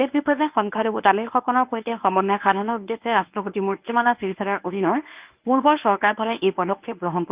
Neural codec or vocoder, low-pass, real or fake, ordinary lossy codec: codec, 24 kHz, 0.9 kbps, WavTokenizer, medium speech release version 1; 3.6 kHz; fake; Opus, 24 kbps